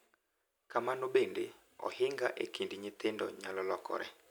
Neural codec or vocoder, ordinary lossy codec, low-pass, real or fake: none; none; none; real